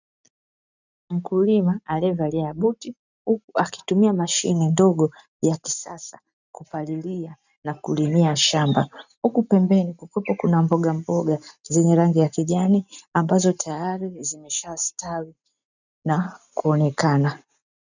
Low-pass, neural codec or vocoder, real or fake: 7.2 kHz; none; real